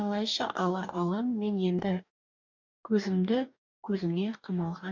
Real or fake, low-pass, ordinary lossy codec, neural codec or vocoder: fake; 7.2 kHz; MP3, 64 kbps; codec, 44.1 kHz, 2.6 kbps, DAC